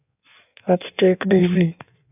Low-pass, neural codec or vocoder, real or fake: 3.6 kHz; codec, 16 kHz in and 24 kHz out, 1.1 kbps, FireRedTTS-2 codec; fake